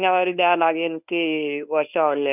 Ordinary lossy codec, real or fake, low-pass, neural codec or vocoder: none; fake; 3.6 kHz; codec, 24 kHz, 0.9 kbps, WavTokenizer, medium speech release version 1